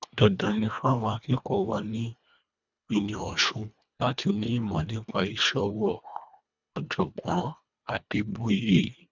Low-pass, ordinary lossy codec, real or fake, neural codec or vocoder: 7.2 kHz; none; fake; codec, 24 kHz, 1.5 kbps, HILCodec